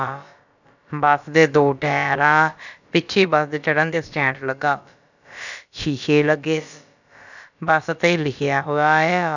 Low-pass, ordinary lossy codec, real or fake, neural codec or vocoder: 7.2 kHz; none; fake; codec, 16 kHz, about 1 kbps, DyCAST, with the encoder's durations